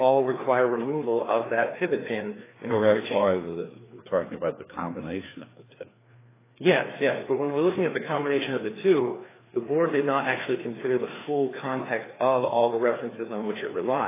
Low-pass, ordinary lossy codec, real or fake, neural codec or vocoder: 3.6 kHz; AAC, 16 kbps; fake; codec, 16 kHz, 2 kbps, FreqCodec, larger model